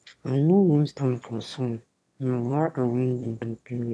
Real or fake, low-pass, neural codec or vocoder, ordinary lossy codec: fake; none; autoencoder, 22.05 kHz, a latent of 192 numbers a frame, VITS, trained on one speaker; none